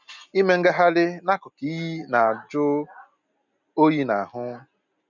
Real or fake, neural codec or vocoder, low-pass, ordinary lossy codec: real; none; 7.2 kHz; none